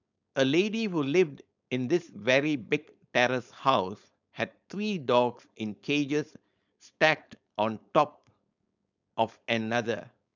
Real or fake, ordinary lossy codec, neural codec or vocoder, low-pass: fake; none; codec, 16 kHz, 4.8 kbps, FACodec; 7.2 kHz